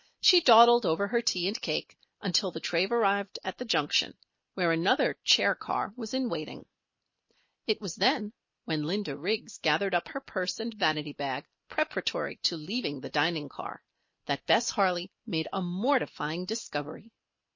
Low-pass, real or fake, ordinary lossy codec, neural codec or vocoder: 7.2 kHz; real; MP3, 32 kbps; none